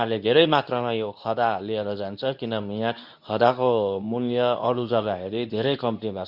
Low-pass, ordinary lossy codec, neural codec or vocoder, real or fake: 5.4 kHz; none; codec, 24 kHz, 0.9 kbps, WavTokenizer, medium speech release version 2; fake